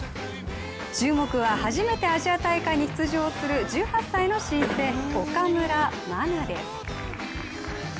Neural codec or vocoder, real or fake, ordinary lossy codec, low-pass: none; real; none; none